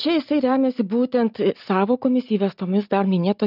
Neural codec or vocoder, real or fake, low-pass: none; real; 5.4 kHz